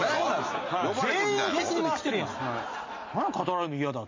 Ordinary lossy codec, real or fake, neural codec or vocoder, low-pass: MP3, 32 kbps; real; none; 7.2 kHz